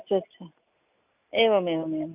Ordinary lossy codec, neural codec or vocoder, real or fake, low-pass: none; none; real; 3.6 kHz